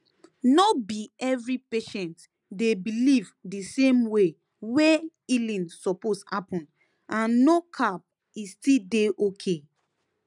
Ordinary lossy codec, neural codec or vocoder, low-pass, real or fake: none; none; 10.8 kHz; real